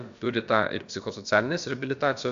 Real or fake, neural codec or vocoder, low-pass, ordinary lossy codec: fake; codec, 16 kHz, about 1 kbps, DyCAST, with the encoder's durations; 7.2 kHz; AAC, 64 kbps